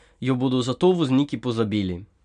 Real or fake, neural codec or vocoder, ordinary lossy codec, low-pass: real; none; none; 9.9 kHz